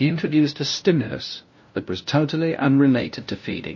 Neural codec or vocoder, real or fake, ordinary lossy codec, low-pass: codec, 16 kHz, 0.5 kbps, FunCodec, trained on LibriTTS, 25 frames a second; fake; MP3, 32 kbps; 7.2 kHz